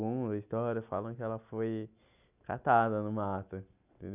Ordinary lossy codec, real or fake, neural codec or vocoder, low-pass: none; real; none; 3.6 kHz